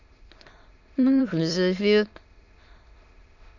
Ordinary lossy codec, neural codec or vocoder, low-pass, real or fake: none; autoencoder, 22.05 kHz, a latent of 192 numbers a frame, VITS, trained on many speakers; 7.2 kHz; fake